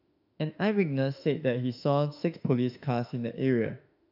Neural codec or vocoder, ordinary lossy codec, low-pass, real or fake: autoencoder, 48 kHz, 32 numbers a frame, DAC-VAE, trained on Japanese speech; none; 5.4 kHz; fake